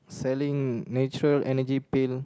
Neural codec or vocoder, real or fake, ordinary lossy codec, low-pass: none; real; none; none